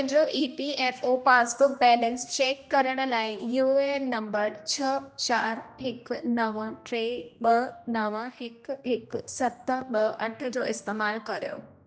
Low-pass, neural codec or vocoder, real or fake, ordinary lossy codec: none; codec, 16 kHz, 1 kbps, X-Codec, HuBERT features, trained on general audio; fake; none